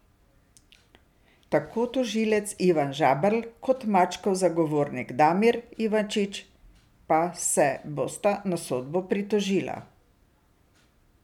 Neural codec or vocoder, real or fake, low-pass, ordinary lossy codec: none; real; 19.8 kHz; none